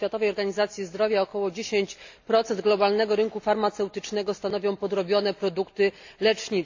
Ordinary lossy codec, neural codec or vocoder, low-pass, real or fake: AAC, 48 kbps; none; 7.2 kHz; real